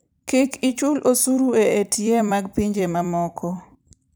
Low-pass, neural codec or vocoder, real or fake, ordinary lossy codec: none; vocoder, 44.1 kHz, 128 mel bands every 256 samples, BigVGAN v2; fake; none